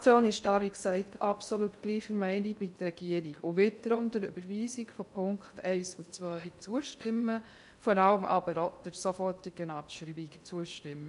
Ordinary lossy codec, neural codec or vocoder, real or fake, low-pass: none; codec, 16 kHz in and 24 kHz out, 0.6 kbps, FocalCodec, streaming, 2048 codes; fake; 10.8 kHz